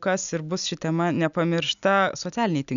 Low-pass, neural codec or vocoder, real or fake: 7.2 kHz; none; real